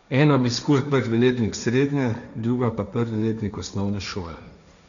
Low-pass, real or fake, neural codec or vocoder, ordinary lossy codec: 7.2 kHz; fake; codec, 16 kHz, 1.1 kbps, Voila-Tokenizer; MP3, 96 kbps